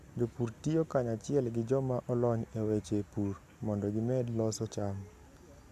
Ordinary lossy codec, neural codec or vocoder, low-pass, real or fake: MP3, 96 kbps; none; 14.4 kHz; real